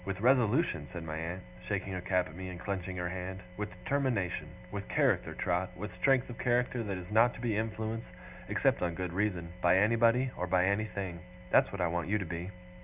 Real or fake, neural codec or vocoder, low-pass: real; none; 3.6 kHz